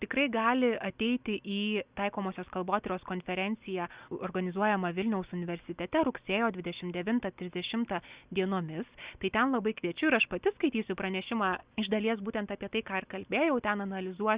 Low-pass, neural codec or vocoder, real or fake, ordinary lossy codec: 3.6 kHz; none; real; Opus, 64 kbps